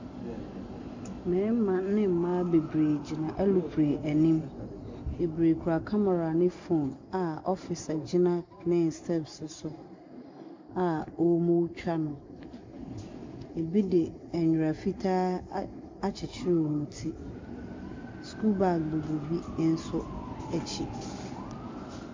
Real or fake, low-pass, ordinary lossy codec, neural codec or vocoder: real; 7.2 kHz; MP3, 64 kbps; none